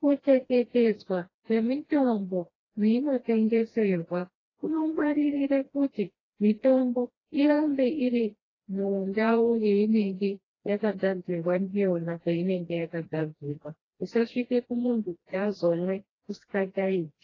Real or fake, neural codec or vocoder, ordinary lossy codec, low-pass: fake; codec, 16 kHz, 1 kbps, FreqCodec, smaller model; AAC, 32 kbps; 7.2 kHz